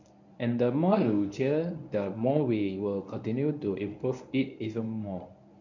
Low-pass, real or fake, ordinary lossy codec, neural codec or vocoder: 7.2 kHz; fake; none; codec, 24 kHz, 0.9 kbps, WavTokenizer, medium speech release version 1